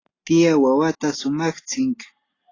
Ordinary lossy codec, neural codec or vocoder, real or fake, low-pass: AAC, 32 kbps; none; real; 7.2 kHz